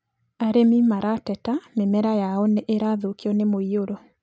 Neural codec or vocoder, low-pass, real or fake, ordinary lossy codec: none; none; real; none